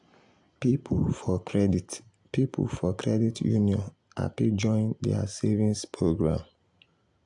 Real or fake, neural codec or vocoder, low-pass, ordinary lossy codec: real; none; 10.8 kHz; AAC, 64 kbps